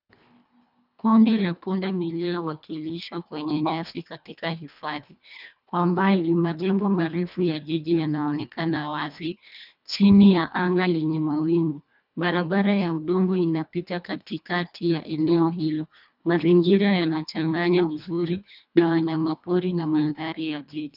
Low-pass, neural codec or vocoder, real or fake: 5.4 kHz; codec, 24 kHz, 1.5 kbps, HILCodec; fake